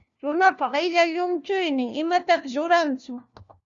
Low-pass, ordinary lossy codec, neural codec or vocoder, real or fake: 7.2 kHz; Opus, 64 kbps; codec, 16 kHz, 1 kbps, FunCodec, trained on LibriTTS, 50 frames a second; fake